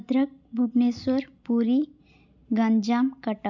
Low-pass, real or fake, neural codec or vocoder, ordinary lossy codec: 7.2 kHz; real; none; none